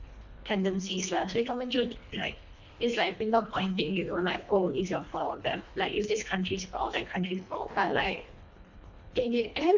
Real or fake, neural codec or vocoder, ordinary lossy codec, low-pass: fake; codec, 24 kHz, 1.5 kbps, HILCodec; MP3, 64 kbps; 7.2 kHz